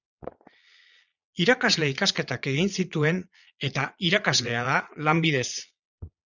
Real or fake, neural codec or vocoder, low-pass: fake; vocoder, 22.05 kHz, 80 mel bands, Vocos; 7.2 kHz